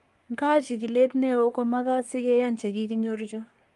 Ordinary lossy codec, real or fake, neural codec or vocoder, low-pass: Opus, 32 kbps; fake; codec, 24 kHz, 1 kbps, SNAC; 10.8 kHz